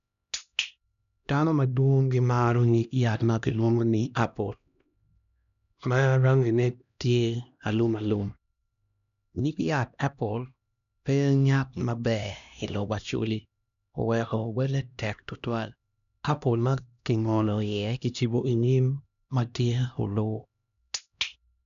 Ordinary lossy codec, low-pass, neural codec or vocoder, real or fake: none; 7.2 kHz; codec, 16 kHz, 1 kbps, X-Codec, HuBERT features, trained on LibriSpeech; fake